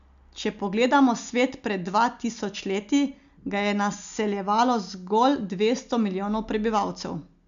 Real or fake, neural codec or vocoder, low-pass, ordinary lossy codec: real; none; 7.2 kHz; MP3, 96 kbps